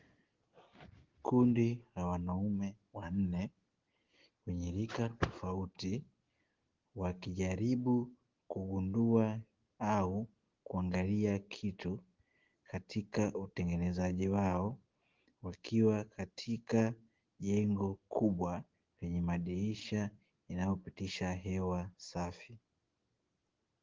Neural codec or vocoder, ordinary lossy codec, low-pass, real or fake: none; Opus, 16 kbps; 7.2 kHz; real